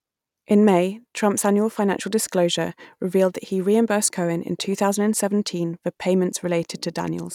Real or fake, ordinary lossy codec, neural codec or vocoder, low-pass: real; none; none; 19.8 kHz